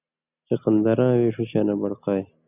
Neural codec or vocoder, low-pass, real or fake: none; 3.6 kHz; real